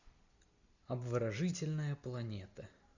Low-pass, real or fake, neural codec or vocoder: 7.2 kHz; real; none